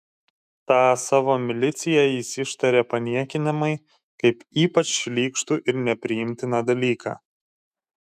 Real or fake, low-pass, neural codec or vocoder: fake; 14.4 kHz; autoencoder, 48 kHz, 128 numbers a frame, DAC-VAE, trained on Japanese speech